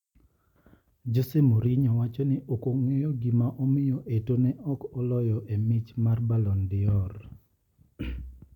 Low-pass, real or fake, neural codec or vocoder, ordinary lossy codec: 19.8 kHz; real; none; none